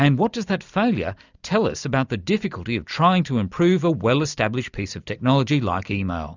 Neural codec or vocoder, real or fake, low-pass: none; real; 7.2 kHz